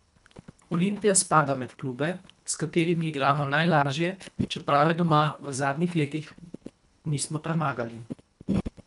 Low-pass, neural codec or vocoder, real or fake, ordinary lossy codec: 10.8 kHz; codec, 24 kHz, 1.5 kbps, HILCodec; fake; none